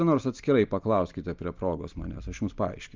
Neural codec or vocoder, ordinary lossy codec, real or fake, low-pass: none; Opus, 24 kbps; real; 7.2 kHz